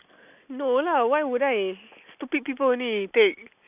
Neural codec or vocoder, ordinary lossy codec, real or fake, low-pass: none; none; real; 3.6 kHz